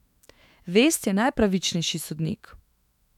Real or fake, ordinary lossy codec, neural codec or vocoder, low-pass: fake; none; autoencoder, 48 kHz, 128 numbers a frame, DAC-VAE, trained on Japanese speech; 19.8 kHz